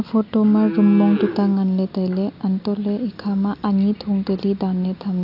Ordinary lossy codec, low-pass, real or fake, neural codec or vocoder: AAC, 48 kbps; 5.4 kHz; real; none